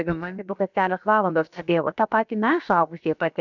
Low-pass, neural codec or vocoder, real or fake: 7.2 kHz; codec, 16 kHz, about 1 kbps, DyCAST, with the encoder's durations; fake